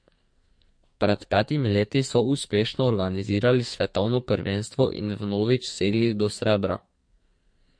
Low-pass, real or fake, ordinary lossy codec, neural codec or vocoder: 9.9 kHz; fake; MP3, 48 kbps; codec, 44.1 kHz, 2.6 kbps, SNAC